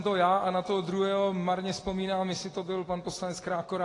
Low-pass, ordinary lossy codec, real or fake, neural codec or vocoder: 10.8 kHz; AAC, 32 kbps; real; none